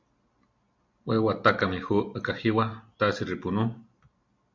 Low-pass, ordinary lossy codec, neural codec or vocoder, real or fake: 7.2 kHz; MP3, 64 kbps; none; real